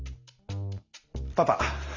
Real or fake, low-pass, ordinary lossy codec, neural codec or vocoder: real; 7.2 kHz; Opus, 64 kbps; none